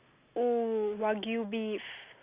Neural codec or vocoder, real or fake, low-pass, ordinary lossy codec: none; real; 3.6 kHz; Opus, 64 kbps